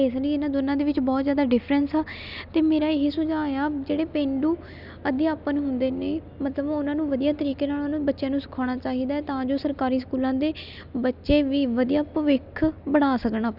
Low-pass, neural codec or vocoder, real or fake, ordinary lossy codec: 5.4 kHz; none; real; none